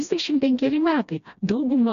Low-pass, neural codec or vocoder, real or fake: 7.2 kHz; codec, 16 kHz, 1 kbps, FreqCodec, smaller model; fake